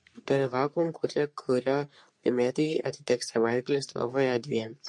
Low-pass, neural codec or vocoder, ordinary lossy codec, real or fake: 10.8 kHz; codec, 44.1 kHz, 3.4 kbps, Pupu-Codec; MP3, 48 kbps; fake